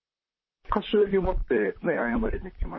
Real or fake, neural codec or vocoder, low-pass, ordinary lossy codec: fake; vocoder, 44.1 kHz, 128 mel bands, Pupu-Vocoder; 7.2 kHz; MP3, 24 kbps